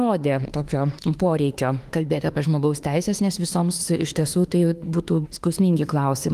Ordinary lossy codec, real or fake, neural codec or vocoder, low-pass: Opus, 24 kbps; fake; autoencoder, 48 kHz, 32 numbers a frame, DAC-VAE, trained on Japanese speech; 14.4 kHz